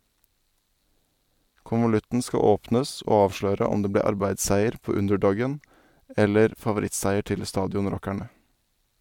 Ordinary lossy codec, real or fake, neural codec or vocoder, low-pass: MP3, 96 kbps; real; none; 19.8 kHz